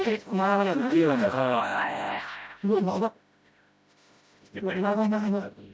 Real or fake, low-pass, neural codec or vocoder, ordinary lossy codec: fake; none; codec, 16 kHz, 0.5 kbps, FreqCodec, smaller model; none